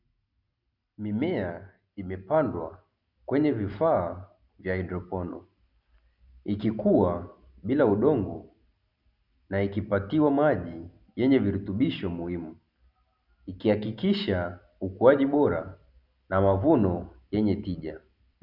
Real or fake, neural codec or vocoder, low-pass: real; none; 5.4 kHz